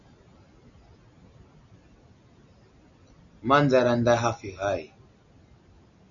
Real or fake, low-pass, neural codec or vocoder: real; 7.2 kHz; none